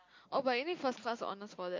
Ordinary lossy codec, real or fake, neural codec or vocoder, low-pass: MP3, 48 kbps; real; none; 7.2 kHz